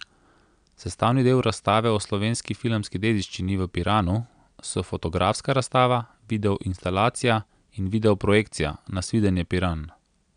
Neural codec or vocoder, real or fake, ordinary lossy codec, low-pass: none; real; none; 9.9 kHz